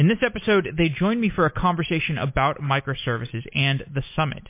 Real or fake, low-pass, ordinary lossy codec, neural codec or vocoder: real; 3.6 kHz; MP3, 24 kbps; none